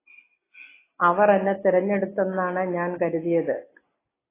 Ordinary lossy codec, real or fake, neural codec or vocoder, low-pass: AAC, 16 kbps; real; none; 3.6 kHz